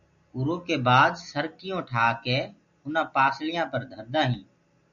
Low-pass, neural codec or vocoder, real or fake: 7.2 kHz; none; real